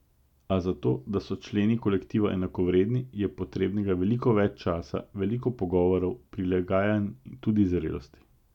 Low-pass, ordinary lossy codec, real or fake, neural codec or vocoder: 19.8 kHz; none; real; none